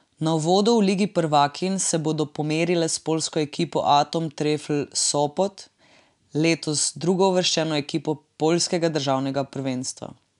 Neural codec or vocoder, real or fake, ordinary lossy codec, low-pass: none; real; none; 10.8 kHz